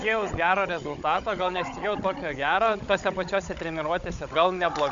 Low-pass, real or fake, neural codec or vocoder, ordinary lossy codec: 7.2 kHz; fake; codec, 16 kHz, 16 kbps, FunCodec, trained on Chinese and English, 50 frames a second; MP3, 64 kbps